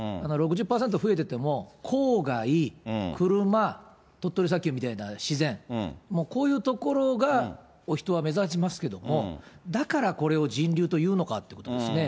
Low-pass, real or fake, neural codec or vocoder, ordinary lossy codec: none; real; none; none